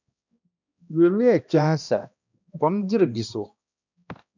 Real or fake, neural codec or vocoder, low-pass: fake; codec, 16 kHz, 1 kbps, X-Codec, HuBERT features, trained on balanced general audio; 7.2 kHz